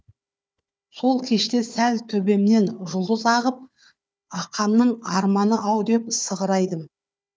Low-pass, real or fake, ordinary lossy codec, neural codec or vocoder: none; fake; none; codec, 16 kHz, 4 kbps, FunCodec, trained on Chinese and English, 50 frames a second